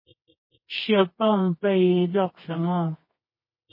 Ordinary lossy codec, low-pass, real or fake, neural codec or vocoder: MP3, 24 kbps; 5.4 kHz; fake; codec, 24 kHz, 0.9 kbps, WavTokenizer, medium music audio release